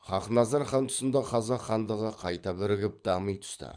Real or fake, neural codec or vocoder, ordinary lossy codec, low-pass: fake; codec, 24 kHz, 6 kbps, HILCodec; none; 9.9 kHz